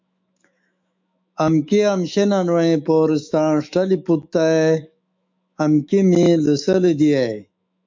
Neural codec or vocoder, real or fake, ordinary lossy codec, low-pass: autoencoder, 48 kHz, 128 numbers a frame, DAC-VAE, trained on Japanese speech; fake; MP3, 64 kbps; 7.2 kHz